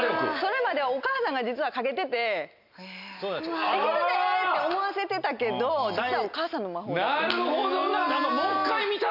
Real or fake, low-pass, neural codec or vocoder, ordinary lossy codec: real; 5.4 kHz; none; none